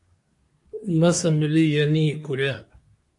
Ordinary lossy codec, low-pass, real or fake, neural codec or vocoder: MP3, 48 kbps; 10.8 kHz; fake; codec, 24 kHz, 1 kbps, SNAC